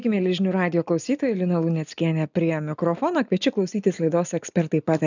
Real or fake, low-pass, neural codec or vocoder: real; 7.2 kHz; none